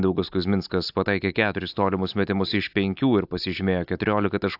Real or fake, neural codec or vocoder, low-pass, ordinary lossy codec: real; none; 5.4 kHz; AAC, 48 kbps